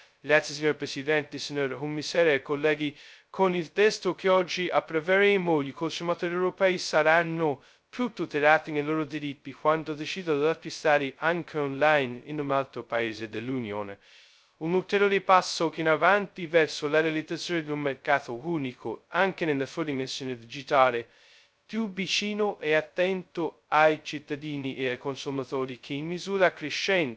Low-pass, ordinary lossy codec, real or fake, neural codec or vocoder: none; none; fake; codec, 16 kHz, 0.2 kbps, FocalCodec